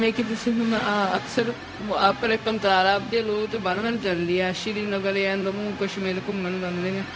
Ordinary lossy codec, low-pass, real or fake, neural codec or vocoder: none; none; fake; codec, 16 kHz, 0.4 kbps, LongCat-Audio-Codec